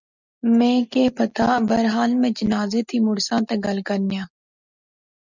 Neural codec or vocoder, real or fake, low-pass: none; real; 7.2 kHz